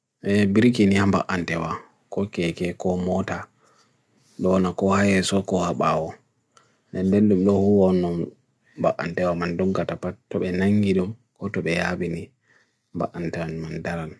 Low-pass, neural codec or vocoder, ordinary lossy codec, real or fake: none; none; none; real